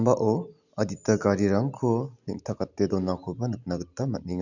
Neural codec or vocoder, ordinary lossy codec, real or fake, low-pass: none; none; real; 7.2 kHz